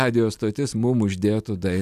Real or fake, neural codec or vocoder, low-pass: real; none; 14.4 kHz